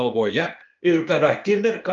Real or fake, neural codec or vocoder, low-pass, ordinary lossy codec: fake; codec, 16 kHz, 0.8 kbps, ZipCodec; 7.2 kHz; Opus, 24 kbps